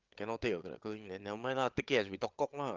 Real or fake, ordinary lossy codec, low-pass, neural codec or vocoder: fake; Opus, 24 kbps; 7.2 kHz; codec, 16 kHz, 8 kbps, FreqCodec, larger model